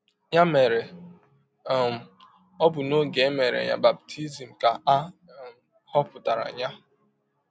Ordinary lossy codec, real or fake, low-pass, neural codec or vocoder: none; real; none; none